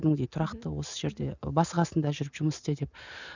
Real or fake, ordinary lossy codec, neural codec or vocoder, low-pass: real; none; none; 7.2 kHz